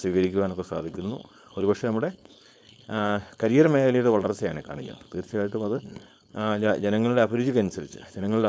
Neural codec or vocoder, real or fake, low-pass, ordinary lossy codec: codec, 16 kHz, 4.8 kbps, FACodec; fake; none; none